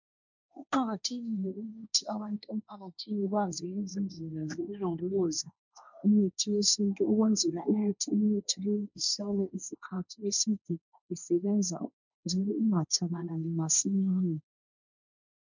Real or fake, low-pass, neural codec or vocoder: fake; 7.2 kHz; codec, 16 kHz, 1.1 kbps, Voila-Tokenizer